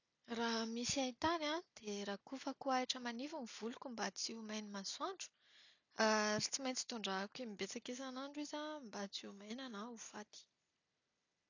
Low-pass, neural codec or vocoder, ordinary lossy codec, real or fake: 7.2 kHz; none; none; real